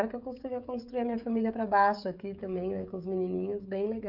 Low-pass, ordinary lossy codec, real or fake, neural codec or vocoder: 5.4 kHz; none; fake; codec, 16 kHz, 16 kbps, FreqCodec, smaller model